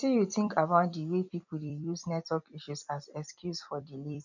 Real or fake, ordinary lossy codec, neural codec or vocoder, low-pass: fake; none; vocoder, 44.1 kHz, 128 mel bands every 256 samples, BigVGAN v2; 7.2 kHz